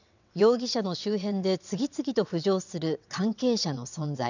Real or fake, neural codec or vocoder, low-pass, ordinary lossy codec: fake; vocoder, 22.05 kHz, 80 mel bands, WaveNeXt; 7.2 kHz; none